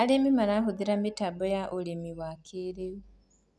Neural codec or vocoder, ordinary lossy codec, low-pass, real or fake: none; none; none; real